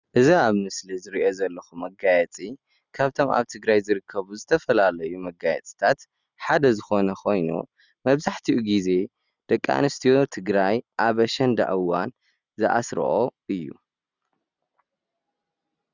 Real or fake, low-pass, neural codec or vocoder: real; 7.2 kHz; none